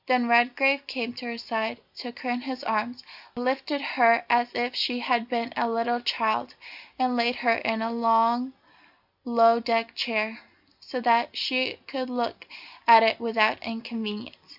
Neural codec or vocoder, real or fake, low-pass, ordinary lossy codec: none; real; 5.4 kHz; Opus, 64 kbps